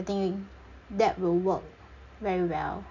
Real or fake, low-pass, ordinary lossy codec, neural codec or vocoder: real; 7.2 kHz; none; none